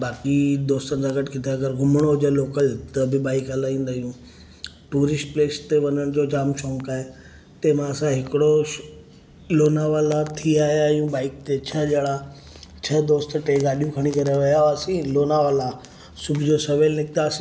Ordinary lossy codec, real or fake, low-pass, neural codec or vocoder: none; real; none; none